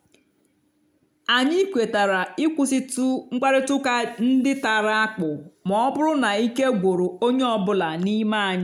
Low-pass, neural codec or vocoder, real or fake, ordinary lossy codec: 19.8 kHz; none; real; none